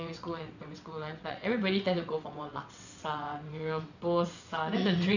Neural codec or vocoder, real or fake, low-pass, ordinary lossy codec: vocoder, 22.05 kHz, 80 mel bands, WaveNeXt; fake; 7.2 kHz; none